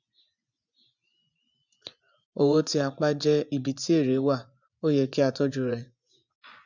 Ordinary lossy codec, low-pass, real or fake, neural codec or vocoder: none; 7.2 kHz; fake; vocoder, 24 kHz, 100 mel bands, Vocos